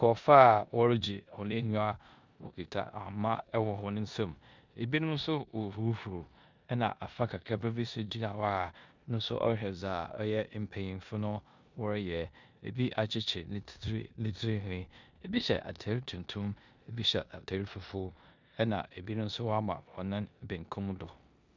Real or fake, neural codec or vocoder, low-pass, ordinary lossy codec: fake; codec, 16 kHz in and 24 kHz out, 0.9 kbps, LongCat-Audio-Codec, four codebook decoder; 7.2 kHz; MP3, 64 kbps